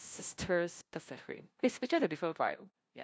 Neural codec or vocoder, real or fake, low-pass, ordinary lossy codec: codec, 16 kHz, 0.5 kbps, FunCodec, trained on LibriTTS, 25 frames a second; fake; none; none